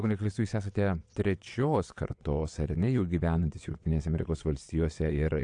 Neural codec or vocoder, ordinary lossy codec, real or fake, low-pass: vocoder, 22.05 kHz, 80 mel bands, WaveNeXt; AAC, 64 kbps; fake; 9.9 kHz